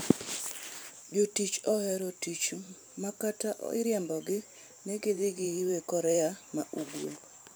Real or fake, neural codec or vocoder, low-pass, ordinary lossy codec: fake; vocoder, 44.1 kHz, 128 mel bands every 512 samples, BigVGAN v2; none; none